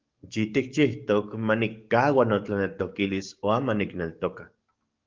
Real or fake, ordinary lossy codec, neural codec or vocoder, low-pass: fake; Opus, 32 kbps; codec, 44.1 kHz, 7.8 kbps, DAC; 7.2 kHz